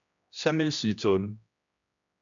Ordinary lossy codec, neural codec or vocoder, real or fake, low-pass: MP3, 96 kbps; codec, 16 kHz, 1 kbps, X-Codec, HuBERT features, trained on general audio; fake; 7.2 kHz